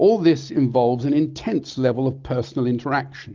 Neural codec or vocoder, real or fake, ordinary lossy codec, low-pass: none; real; Opus, 32 kbps; 7.2 kHz